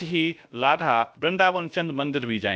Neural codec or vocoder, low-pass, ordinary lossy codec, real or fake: codec, 16 kHz, about 1 kbps, DyCAST, with the encoder's durations; none; none; fake